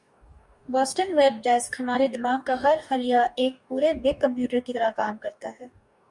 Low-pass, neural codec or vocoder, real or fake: 10.8 kHz; codec, 44.1 kHz, 2.6 kbps, DAC; fake